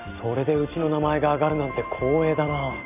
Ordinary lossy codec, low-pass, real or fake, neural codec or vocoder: none; 3.6 kHz; real; none